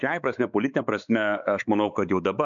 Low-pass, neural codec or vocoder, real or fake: 7.2 kHz; codec, 16 kHz, 4 kbps, X-Codec, WavLM features, trained on Multilingual LibriSpeech; fake